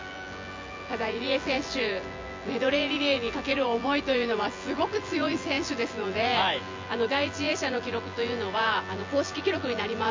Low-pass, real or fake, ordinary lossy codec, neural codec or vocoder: 7.2 kHz; fake; MP3, 64 kbps; vocoder, 24 kHz, 100 mel bands, Vocos